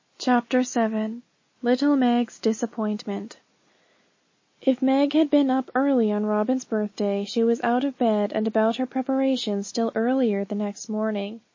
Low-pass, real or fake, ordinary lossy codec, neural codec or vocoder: 7.2 kHz; real; MP3, 32 kbps; none